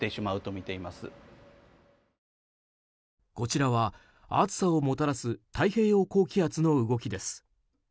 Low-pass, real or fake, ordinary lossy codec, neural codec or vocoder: none; real; none; none